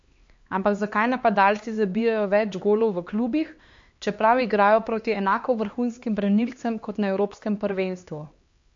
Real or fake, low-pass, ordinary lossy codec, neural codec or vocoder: fake; 7.2 kHz; MP3, 48 kbps; codec, 16 kHz, 2 kbps, X-Codec, HuBERT features, trained on LibriSpeech